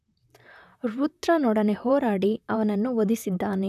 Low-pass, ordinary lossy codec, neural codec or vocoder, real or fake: 14.4 kHz; none; vocoder, 44.1 kHz, 128 mel bands, Pupu-Vocoder; fake